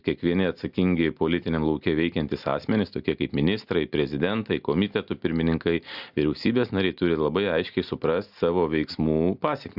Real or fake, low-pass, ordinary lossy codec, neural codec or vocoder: real; 5.4 kHz; Opus, 64 kbps; none